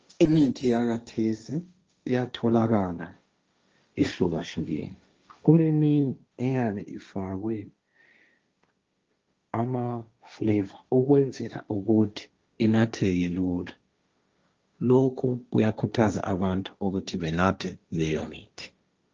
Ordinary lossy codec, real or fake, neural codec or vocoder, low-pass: Opus, 24 kbps; fake; codec, 16 kHz, 1.1 kbps, Voila-Tokenizer; 7.2 kHz